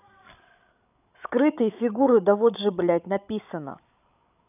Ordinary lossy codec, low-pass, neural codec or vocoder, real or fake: none; 3.6 kHz; codec, 16 kHz, 16 kbps, FreqCodec, larger model; fake